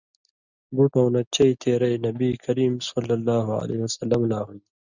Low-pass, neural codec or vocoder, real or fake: 7.2 kHz; none; real